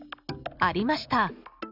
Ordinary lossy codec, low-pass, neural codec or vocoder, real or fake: none; 5.4 kHz; none; real